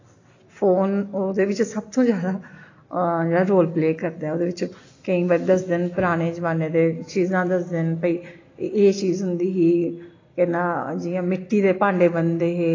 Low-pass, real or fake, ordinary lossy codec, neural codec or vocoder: 7.2 kHz; real; AAC, 32 kbps; none